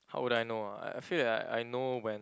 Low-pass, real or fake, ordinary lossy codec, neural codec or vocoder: none; real; none; none